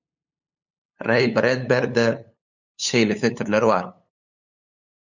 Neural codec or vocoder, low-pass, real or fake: codec, 16 kHz, 8 kbps, FunCodec, trained on LibriTTS, 25 frames a second; 7.2 kHz; fake